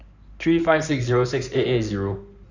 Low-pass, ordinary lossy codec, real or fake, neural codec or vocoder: 7.2 kHz; none; fake; codec, 16 kHz in and 24 kHz out, 2.2 kbps, FireRedTTS-2 codec